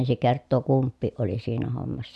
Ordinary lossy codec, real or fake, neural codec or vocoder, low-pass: none; real; none; none